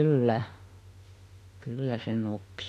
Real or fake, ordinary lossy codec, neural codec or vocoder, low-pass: fake; AAC, 48 kbps; autoencoder, 48 kHz, 32 numbers a frame, DAC-VAE, trained on Japanese speech; 14.4 kHz